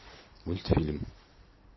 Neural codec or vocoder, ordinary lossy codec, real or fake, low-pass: none; MP3, 24 kbps; real; 7.2 kHz